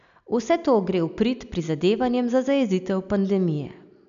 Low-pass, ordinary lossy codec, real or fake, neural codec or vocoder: 7.2 kHz; none; real; none